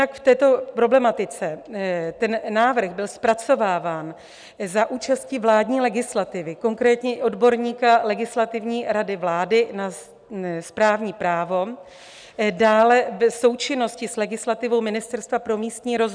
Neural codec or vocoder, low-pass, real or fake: none; 9.9 kHz; real